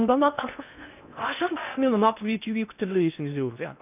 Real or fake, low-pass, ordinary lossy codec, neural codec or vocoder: fake; 3.6 kHz; none; codec, 16 kHz in and 24 kHz out, 0.6 kbps, FocalCodec, streaming, 2048 codes